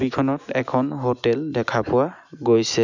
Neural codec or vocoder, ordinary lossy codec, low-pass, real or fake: none; none; 7.2 kHz; real